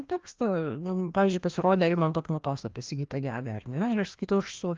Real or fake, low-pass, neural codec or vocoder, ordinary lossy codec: fake; 7.2 kHz; codec, 16 kHz, 1 kbps, FreqCodec, larger model; Opus, 32 kbps